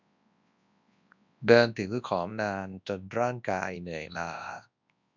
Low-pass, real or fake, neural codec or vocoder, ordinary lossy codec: 7.2 kHz; fake; codec, 24 kHz, 0.9 kbps, WavTokenizer, large speech release; none